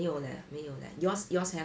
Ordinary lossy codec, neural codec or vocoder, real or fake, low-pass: none; none; real; none